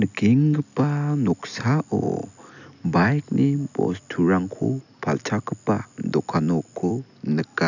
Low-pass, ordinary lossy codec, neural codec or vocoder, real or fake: 7.2 kHz; none; none; real